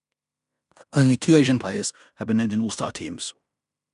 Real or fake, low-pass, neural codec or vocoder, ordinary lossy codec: fake; 10.8 kHz; codec, 16 kHz in and 24 kHz out, 0.9 kbps, LongCat-Audio-Codec, fine tuned four codebook decoder; none